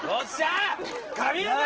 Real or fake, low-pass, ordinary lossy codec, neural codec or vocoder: real; 7.2 kHz; Opus, 16 kbps; none